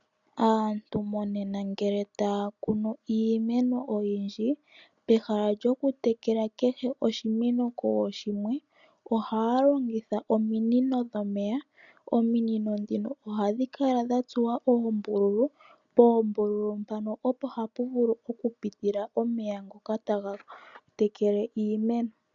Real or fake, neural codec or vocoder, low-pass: real; none; 7.2 kHz